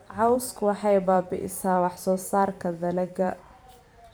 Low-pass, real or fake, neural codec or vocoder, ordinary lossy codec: none; fake; vocoder, 44.1 kHz, 128 mel bands every 512 samples, BigVGAN v2; none